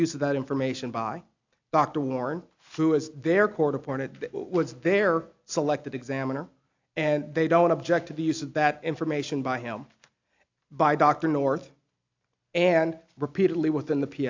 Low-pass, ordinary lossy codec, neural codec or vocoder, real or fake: 7.2 kHz; AAC, 48 kbps; none; real